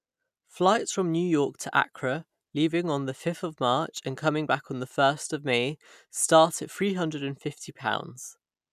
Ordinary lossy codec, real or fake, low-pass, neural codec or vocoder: none; real; 14.4 kHz; none